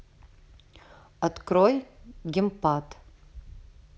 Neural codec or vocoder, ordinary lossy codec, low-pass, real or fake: none; none; none; real